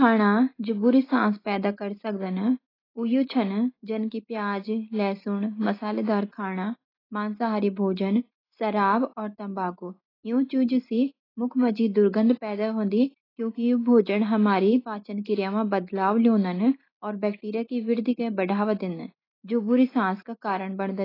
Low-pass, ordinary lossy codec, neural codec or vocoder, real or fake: 5.4 kHz; AAC, 24 kbps; none; real